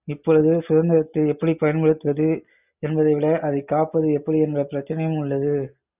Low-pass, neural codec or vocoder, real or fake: 3.6 kHz; none; real